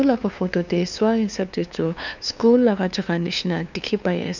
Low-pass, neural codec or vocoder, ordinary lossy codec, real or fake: 7.2 kHz; codec, 16 kHz, 2 kbps, FunCodec, trained on LibriTTS, 25 frames a second; none; fake